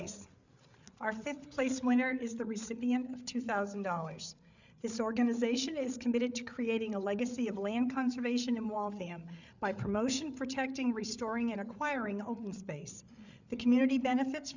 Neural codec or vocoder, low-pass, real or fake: codec, 16 kHz, 8 kbps, FreqCodec, larger model; 7.2 kHz; fake